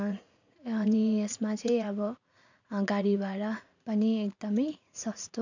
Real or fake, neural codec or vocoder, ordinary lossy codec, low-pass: fake; vocoder, 44.1 kHz, 80 mel bands, Vocos; none; 7.2 kHz